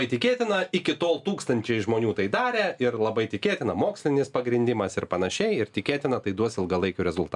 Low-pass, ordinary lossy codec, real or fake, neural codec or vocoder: 10.8 kHz; MP3, 96 kbps; real; none